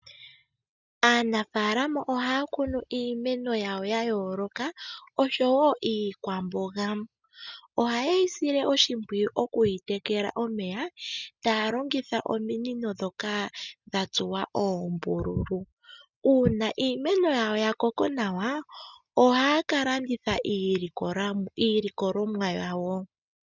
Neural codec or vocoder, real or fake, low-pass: none; real; 7.2 kHz